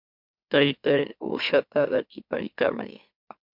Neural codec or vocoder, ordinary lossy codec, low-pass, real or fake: autoencoder, 44.1 kHz, a latent of 192 numbers a frame, MeloTTS; MP3, 48 kbps; 5.4 kHz; fake